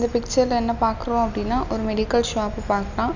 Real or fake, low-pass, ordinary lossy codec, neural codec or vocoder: real; 7.2 kHz; none; none